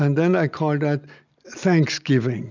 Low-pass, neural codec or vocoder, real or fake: 7.2 kHz; none; real